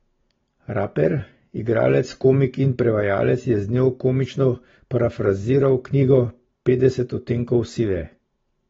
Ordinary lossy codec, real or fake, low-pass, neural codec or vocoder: AAC, 24 kbps; real; 7.2 kHz; none